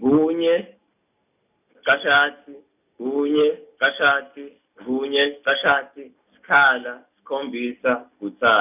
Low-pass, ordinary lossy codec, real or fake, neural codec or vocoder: 3.6 kHz; none; real; none